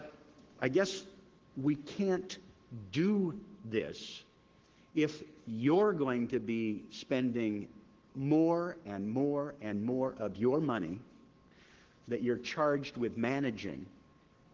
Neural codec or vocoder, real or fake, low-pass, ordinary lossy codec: codec, 44.1 kHz, 7.8 kbps, Pupu-Codec; fake; 7.2 kHz; Opus, 16 kbps